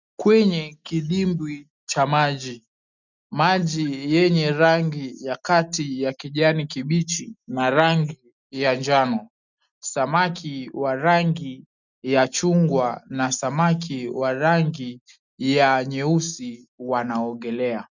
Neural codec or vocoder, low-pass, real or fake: none; 7.2 kHz; real